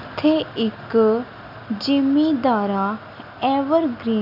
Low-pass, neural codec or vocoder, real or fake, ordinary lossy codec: 5.4 kHz; none; real; none